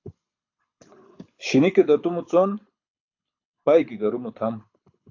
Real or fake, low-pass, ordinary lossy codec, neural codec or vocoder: fake; 7.2 kHz; AAC, 48 kbps; codec, 24 kHz, 6 kbps, HILCodec